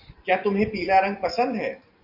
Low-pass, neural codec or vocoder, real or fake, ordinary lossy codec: 5.4 kHz; none; real; Opus, 64 kbps